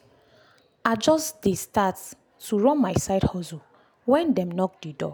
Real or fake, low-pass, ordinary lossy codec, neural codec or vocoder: real; none; none; none